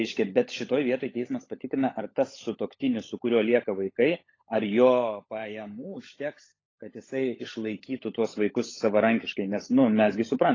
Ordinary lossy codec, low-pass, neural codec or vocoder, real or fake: AAC, 32 kbps; 7.2 kHz; codec, 16 kHz, 16 kbps, FunCodec, trained on LibriTTS, 50 frames a second; fake